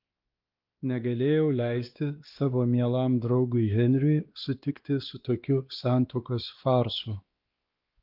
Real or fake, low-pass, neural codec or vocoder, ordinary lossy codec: fake; 5.4 kHz; codec, 16 kHz, 2 kbps, X-Codec, WavLM features, trained on Multilingual LibriSpeech; Opus, 24 kbps